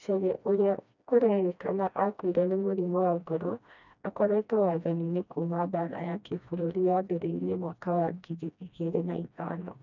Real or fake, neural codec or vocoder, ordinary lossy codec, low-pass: fake; codec, 16 kHz, 1 kbps, FreqCodec, smaller model; none; 7.2 kHz